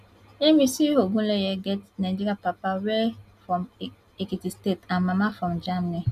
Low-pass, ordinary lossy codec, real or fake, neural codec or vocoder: 14.4 kHz; none; real; none